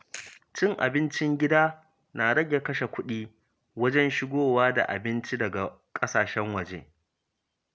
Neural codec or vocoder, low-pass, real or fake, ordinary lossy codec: none; none; real; none